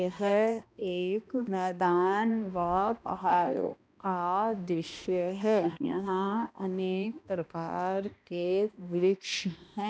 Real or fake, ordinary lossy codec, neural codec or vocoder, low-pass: fake; none; codec, 16 kHz, 1 kbps, X-Codec, HuBERT features, trained on balanced general audio; none